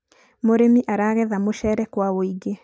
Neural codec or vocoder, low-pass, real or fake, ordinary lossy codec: none; none; real; none